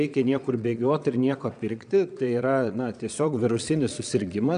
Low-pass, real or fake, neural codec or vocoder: 9.9 kHz; fake; vocoder, 22.05 kHz, 80 mel bands, Vocos